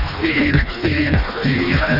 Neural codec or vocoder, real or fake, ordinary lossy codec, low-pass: codec, 16 kHz, 2 kbps, FreqCodec, smaller model; fake; AAC, 32 kbps; 5.4 kHz